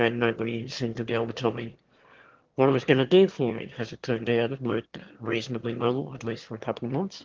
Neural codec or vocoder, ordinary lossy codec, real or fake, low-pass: autoencoder, 22.05 kHz, a latent of 192 numbers a frame, VITS, trained on one speaker; Opus, 16 kbps; fake; 7.2 kHz